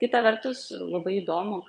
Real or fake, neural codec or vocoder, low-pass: fake; vocoder, 22.05 kHz, 80 mel bands, Vocos; 9.9 kHz